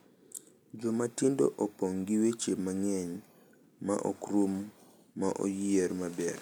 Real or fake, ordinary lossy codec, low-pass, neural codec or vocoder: real; none; none; none